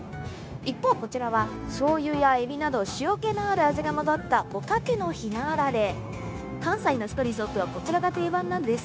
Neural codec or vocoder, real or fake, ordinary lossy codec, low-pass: codec, 16 kHz, 0.9 kbps, LongCat-Audio-Codec; fake; none; none